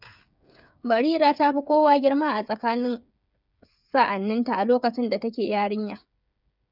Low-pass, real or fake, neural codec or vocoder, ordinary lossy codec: 5.4 kHz; fake; codec, 16 kHz, 8 kbps, FreqCodec, smaller model; none